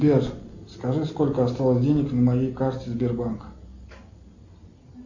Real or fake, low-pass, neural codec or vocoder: real; 7.2 kHz; none